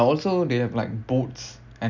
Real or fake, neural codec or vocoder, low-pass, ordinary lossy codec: real; none; 7.2 kHz; none